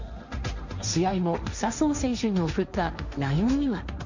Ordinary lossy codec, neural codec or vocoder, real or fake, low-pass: none; codec, 16 kHz, 1.1 kbps, Voila-Tokenizer; fake; 7.2 kHz